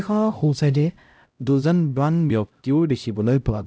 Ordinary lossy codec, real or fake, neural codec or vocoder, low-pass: none; fake; codec, 16 kHz, 0.5 kbps, X-Codec, HuBERT features, trained on LibriSpeech; none